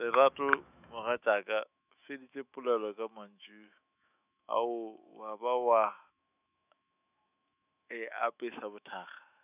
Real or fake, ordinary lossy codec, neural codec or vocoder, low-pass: fake; none; autoencoder, 48 kHz, 128 numbers a frame, DAC-VAE, trained on Japanese speech; 3.6 kHz